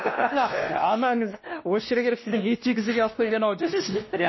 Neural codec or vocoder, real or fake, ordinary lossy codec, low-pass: codec, 16 kHz, 1 kbps, X-Codec, WavLM features, trained on Multilingual LibriSpeech; fake; MP3, 24 kbps; 7.2 kHz